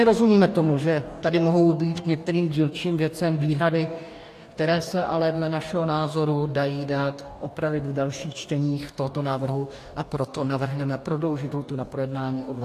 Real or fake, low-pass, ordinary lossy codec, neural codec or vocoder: fake; 14.4 kHz; AAC, 64 kbps; codec, 44.1 kHz, 2.6 kbps, DAC